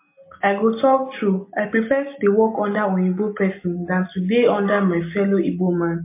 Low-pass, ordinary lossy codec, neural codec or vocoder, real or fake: 3.6 kHz; MP3, 24 kbps; none; real